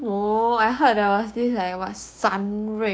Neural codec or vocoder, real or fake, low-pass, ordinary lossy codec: codec, 16 kHz, 6 kbps, DAC; fake; none; none